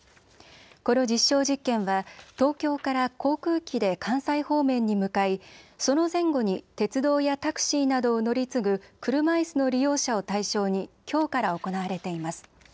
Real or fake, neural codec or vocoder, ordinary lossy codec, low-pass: real; none; none; none